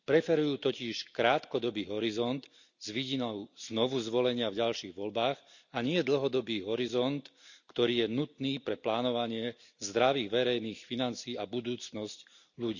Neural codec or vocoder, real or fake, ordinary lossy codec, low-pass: none; real; none; 7.2 kHz